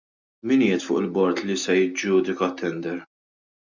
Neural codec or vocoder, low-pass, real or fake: none; 7.2 kHz; real